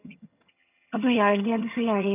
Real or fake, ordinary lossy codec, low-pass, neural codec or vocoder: fake; none; 3.6 kHz; vocoder, 22.05 kHz, 80 mel bands, HiFi-GAN